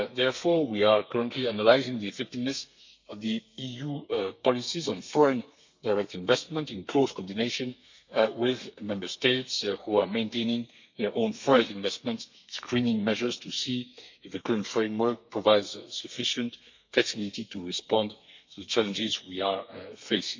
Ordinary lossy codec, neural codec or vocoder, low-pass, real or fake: MP3, 64 kbps; codec, 32 kHz, 1.9 kbps, SNAC; 7.2 kHz; fake